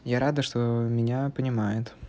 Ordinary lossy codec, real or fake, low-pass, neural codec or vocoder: none; real; none; none